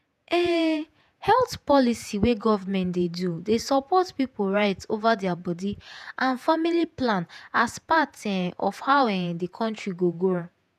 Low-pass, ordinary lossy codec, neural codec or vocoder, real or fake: 14.4 kHz; none; vocoder, 48 kHz, 128 mel bands, Vocos; fake